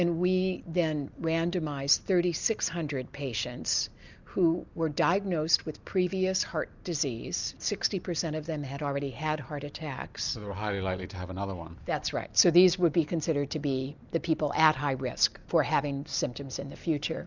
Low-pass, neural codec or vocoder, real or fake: 7.2 kHz; none; real